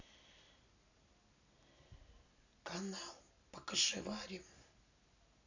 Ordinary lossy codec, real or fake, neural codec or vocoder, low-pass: none; real; none; 7.2 kHz